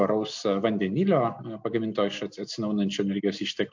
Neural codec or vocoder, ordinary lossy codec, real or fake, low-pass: none; MP3, 48 kbps; real; 7.2 kHz